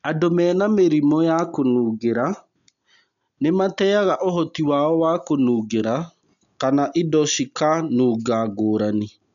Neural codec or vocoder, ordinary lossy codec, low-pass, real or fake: none; MP3, 96 kbps; 7.2 kHz; real